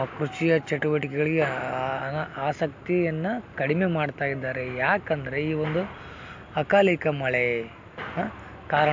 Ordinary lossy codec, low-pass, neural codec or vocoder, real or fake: MP3, 48 kbps; 7.2 kHz; none; real